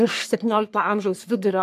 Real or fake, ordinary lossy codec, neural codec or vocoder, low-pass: fake; AAC, 96 kbps; codec, 44.1 kHz, 3.4 kbps, Pupu-Codec; 14.4 kHz